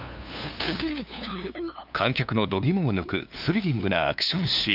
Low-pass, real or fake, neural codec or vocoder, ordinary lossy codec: 5.4 kHz; fake; codec, 16 kHz, 2 kbps, FunCodec, trained on LibriTTS, 25 frames a second; none